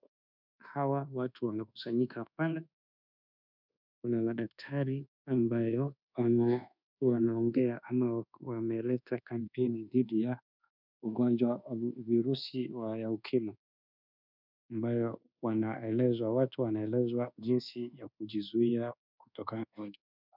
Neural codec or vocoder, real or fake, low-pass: codec, 24 kHz, 1.2 kbps, DualCodec; fake; 5.4 kHz